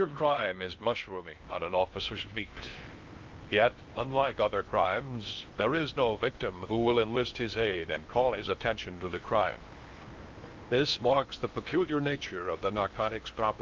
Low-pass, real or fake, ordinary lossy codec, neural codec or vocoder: 7.2 kHz; fake; Opus, 32 kbps; codec, 16 kHz in and 24 kHz out, 0.8 kbps, FocalCodec, streaming, 65536 codes